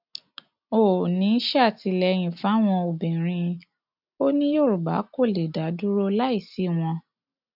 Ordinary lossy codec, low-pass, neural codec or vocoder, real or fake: none; 5.4 kHz; none; real